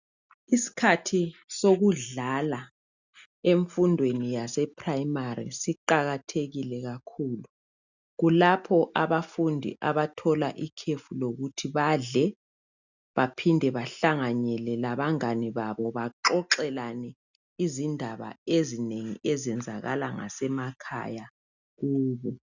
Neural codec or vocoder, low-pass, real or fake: none; 7.2 kHz; real